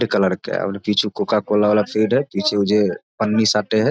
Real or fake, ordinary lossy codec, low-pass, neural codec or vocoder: real; none; none; none